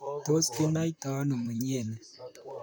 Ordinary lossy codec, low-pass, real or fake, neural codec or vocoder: none; none; fake; vocoder, 44.1 kHz, 128 mel bands, Pupu-Vocoder